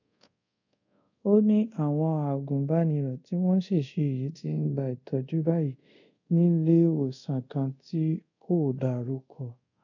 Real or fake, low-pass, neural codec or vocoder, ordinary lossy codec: fake; 7.2 kHz; codec, 24 kHz, 0.5 kbps, DualCodec; none